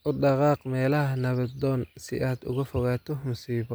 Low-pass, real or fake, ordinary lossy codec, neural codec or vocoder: none; real; none; none